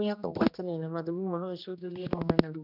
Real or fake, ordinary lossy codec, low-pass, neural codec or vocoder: fake; none; 5.4 kHz; codec, 16 kHz, 1 kbps, X-Codec, HuBERT features, trained on general audio